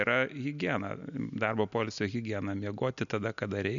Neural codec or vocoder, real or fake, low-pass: none; real; 7.2 kHz